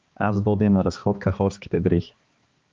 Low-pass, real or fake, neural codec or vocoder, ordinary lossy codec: 7.2 kHz; fake; codec, 16 kHz, 2 kbps, X-Codec, HuBERT features, trained on balanced general audio; Opus, 24 kbps